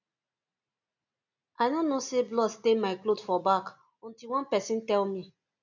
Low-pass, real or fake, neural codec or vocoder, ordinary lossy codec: 7.2 kHz; real; none; none